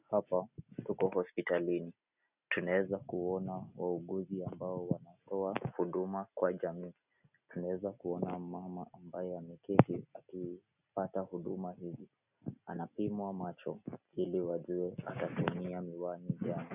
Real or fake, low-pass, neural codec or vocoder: real; 3.6 kHz; none